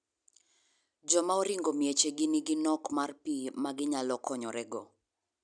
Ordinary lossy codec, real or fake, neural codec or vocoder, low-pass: none; real; none; 9.9 kHz